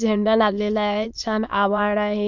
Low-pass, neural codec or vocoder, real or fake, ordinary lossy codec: 7.2 kHz; autoencoder, 22.05 kHz, a latent of 192 numbers a frame, VITS, trained on many speakers; fake; none